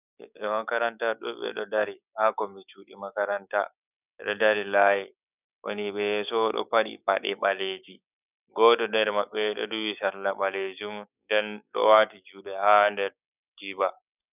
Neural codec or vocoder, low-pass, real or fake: codec, 16 kHz, 6 kbps, DAC; 3.6 kHz; fake